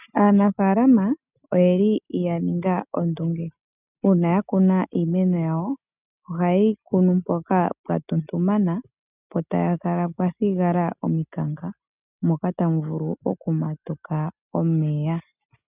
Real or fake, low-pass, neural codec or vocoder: real; 3.6 kHz; none